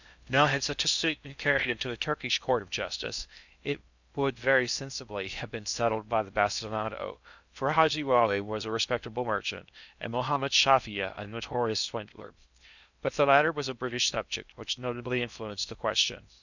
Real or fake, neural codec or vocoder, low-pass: fake; codec, 16 kHz in and 24 kHz out, 0.6 kbps, FocalCodec, streaming, 4096 codes; 7.2 kHz